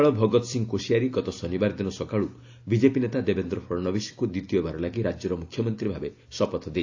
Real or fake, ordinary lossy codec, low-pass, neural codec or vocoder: real; AAC, 48 kbps; 7.2 kHz; none